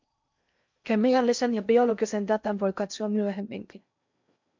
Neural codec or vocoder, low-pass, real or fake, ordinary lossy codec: codec, 16 kHz in and 24 kHz out, 0.6 kbps, FocalCodec, streaming, 4096 codes; 7.2 kHz; fake; MP3, 64 kbps